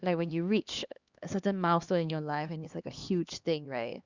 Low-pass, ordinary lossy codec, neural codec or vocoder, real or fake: 7.2 kHz; Opus, 64 kbps; codec, 16 kHz, 2 kbps, X-Codec, HuBERT features, trained on LibriSpeech; fake